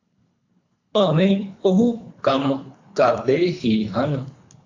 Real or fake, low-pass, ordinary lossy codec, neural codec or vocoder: fake; 7.2 kHz; AAC, 48 kbps; codec, 24 kHz, 3 kbps, HILCodec